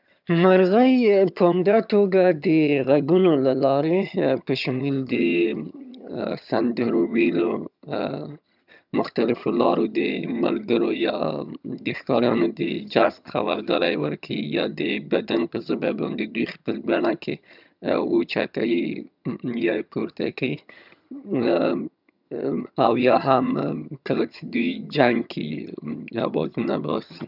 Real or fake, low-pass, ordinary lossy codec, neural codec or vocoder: fake; 5.4 kHz; none; vocoder, 22.05 kHz, 80 mel bands, HiFi-GAN